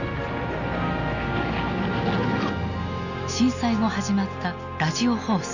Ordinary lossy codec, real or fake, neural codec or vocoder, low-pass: none; real; none; 7.2 kHz